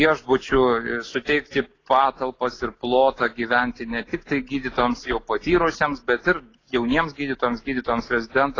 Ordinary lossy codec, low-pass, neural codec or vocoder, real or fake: AAC, 32 kbps; 7.2 kHz; none; real